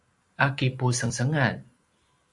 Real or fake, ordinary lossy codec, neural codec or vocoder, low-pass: fake; AAC, 64 kbps; vocoder, 44.1 kHz, 128 mel bands every 512 samples, BigVGAN v2; 10.8 kHz